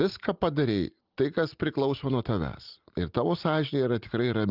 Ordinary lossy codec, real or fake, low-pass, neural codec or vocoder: Opus, 24 kbps; real; 5.4 kHz; none